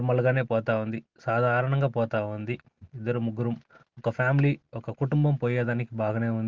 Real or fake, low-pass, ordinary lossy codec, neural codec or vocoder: real; 7.2 kHz; Opus, 16 kbps; none